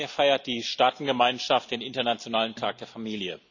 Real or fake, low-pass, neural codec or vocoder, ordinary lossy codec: real; 7.2 kHz; none; none